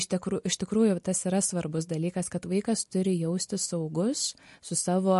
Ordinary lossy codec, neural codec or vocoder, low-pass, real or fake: MP3, 48 kbps; none; 14.4 kHz; real